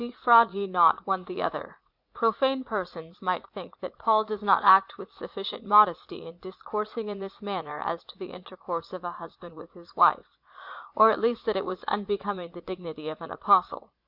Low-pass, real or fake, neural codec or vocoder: 5.4 kHz; fake; vocoder, 44.1 kHz, 80 mel bands, Vocos